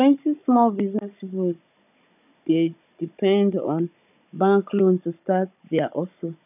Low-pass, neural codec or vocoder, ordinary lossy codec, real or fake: 3.6 kHz; codec, 16 kHz, 16 kbps, FunCodec, trained on Chinese and English, 50 frames a second; none; fake